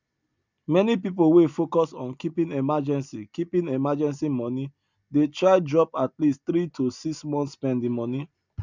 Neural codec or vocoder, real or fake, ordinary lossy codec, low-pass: none; real; none; 7.2 kHz